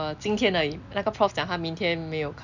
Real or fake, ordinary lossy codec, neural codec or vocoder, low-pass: real; none; none; 7.2 kHz